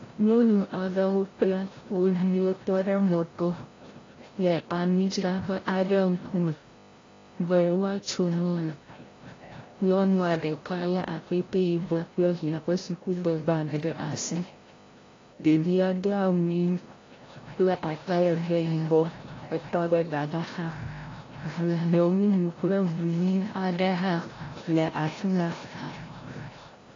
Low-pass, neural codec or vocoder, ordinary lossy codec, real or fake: 7.2 kHz; codec, 16 kHz, 0.5 kbps, FreqCodec, larger model; AAC, 32 kbps; fake